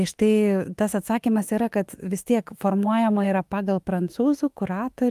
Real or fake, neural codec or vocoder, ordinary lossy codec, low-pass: fake; autoencoder, 48 kHz, 32 numbers a frame, DAC-VAE, trained on Japanese speech; Opus, 32 kbps; 14.4 kHz